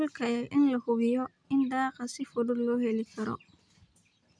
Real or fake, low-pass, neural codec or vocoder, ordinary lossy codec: real; 9.9 kHz; none; none